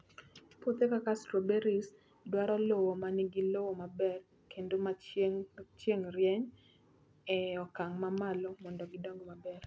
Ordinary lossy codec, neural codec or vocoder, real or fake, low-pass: none; none; real; none